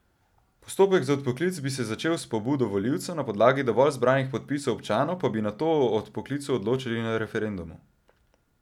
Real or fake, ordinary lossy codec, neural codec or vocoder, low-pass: real; none; none; 19.8 kHz